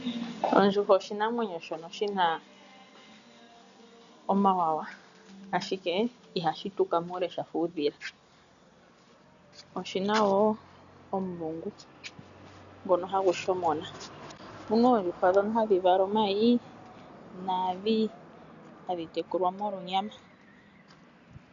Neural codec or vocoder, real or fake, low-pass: none; real; 7.2 kHz